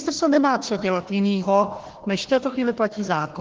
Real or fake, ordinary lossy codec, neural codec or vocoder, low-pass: fake; Opus, 16 kbps; codec, 16 kHz, 1 kbps, FunCodec, trained on Chinese and English, 50 frames a second; 7.2 kHz